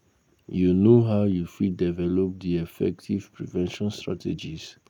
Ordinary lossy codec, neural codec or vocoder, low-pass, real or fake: none; none; 19.8 kHz; real